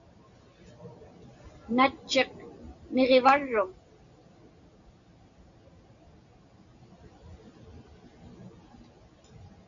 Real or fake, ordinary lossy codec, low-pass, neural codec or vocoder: real; MP3, 64 kbps; 7.2 kHz; none